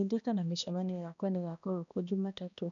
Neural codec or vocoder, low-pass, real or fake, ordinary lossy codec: codec, 16 kHz, 1 kbps, X-Codec, HuBERT features, trained on balanced general audio; 7.2 kHz; fake; none